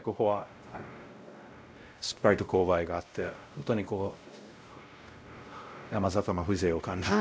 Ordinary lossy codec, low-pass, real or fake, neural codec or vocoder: none; none; fake; codec, 16 kHz, 0.5 kbps, X-Codec, WavLM features, trained on Multilingual LibriSpeech